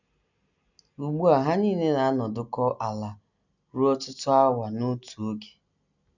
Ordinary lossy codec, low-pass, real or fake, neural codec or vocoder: none; 7.2 kHz; real; none